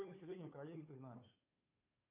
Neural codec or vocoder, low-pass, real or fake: codec, 16 kHz, 2 kbps, FunCodec, trained on Chinese and English, 25 frames a second; 3.6 kHz; fake